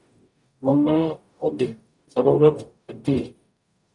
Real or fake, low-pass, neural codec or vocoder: fake; 10.8 kHz; codec, 44.1 kHz, 0.9 kbps, DAC